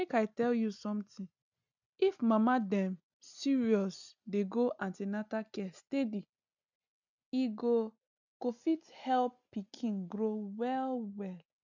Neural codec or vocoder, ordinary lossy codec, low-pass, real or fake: none; none; 7.2 kHz; real